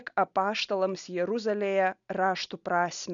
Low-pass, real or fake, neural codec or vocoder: 7.2 kHz; real; none